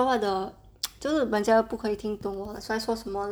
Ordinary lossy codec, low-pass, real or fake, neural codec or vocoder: none; none; real; none